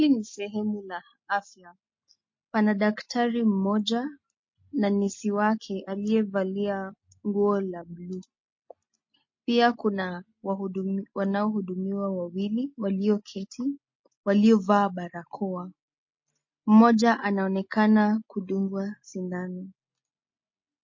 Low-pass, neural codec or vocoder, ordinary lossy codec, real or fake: 7.2 kHz; none; MP3, 32 kbps; real